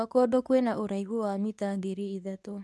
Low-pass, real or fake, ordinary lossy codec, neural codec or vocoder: none; fake; none; codec, 24 kHz, 0.9 kbps, WavTokenizer, medium speech release version 2